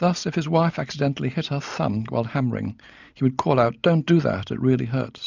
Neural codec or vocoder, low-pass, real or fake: none; 7.2 kHz; real